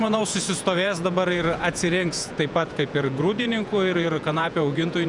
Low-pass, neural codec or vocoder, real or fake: 10.8 kHz; vocoder, 48 kHz, 128 mel bands, Vocos; fake